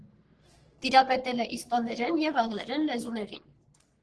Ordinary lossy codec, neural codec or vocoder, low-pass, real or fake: Opus, 16 kbps; codec, 44.1 kHz, 3.4 kbps, Pupu-Codec; 10.8 kHz; fake